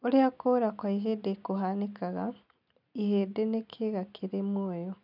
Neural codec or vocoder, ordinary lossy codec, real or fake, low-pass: none; none; real; 5.4 kHz